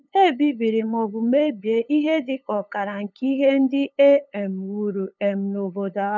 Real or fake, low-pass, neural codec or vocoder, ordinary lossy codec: fake; 7.2 kHz; codec, 16 kHz, 8 kbps, FunCodec, trained on LibriTTS, 25 frames a second; none